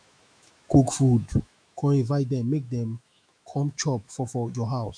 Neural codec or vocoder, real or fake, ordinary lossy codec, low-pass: autoencoder, 48 kHz, 128 numbers a frame, DAC-VAE, trained on Japanese speech; fake; none; 9.9 kHz